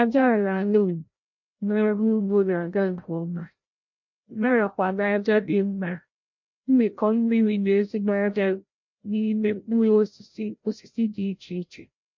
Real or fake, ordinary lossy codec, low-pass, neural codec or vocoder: fake; MP3, 48 kbps; 7.2 kHz; codec, 16 kHz, 0.5 kbps, FreqCodec, larger model